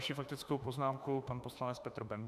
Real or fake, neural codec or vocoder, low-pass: fake; autoencoder, 48 kHz, 32 numbers a frame, DAC-VAE, trained on Japanese speech; 14.4 kHz